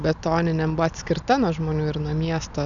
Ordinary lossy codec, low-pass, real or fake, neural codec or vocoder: MP3, 96 kbps; 7.2 kHz; real; none